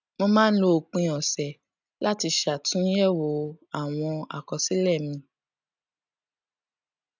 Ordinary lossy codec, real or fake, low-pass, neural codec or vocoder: none; real; 7.2 kHz; none